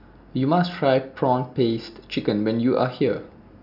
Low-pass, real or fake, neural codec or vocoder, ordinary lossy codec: 5.4 kHz; real; none; none